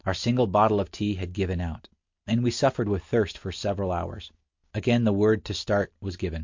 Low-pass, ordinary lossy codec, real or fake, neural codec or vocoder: 7.2 kHz; MP3, 48 kbps; real; none